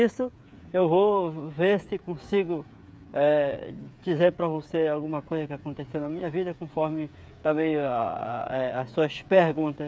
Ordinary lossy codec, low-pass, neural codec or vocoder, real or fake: none; none; codec, 16 kHz, 8 kbps, FreqCodec, smaller model; fake